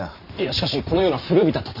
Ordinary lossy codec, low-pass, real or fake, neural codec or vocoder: none; 5.4 kHz; fake; vocoder, 44.1 kHz, 128 mel bands every 512 samples, BigVGAN v2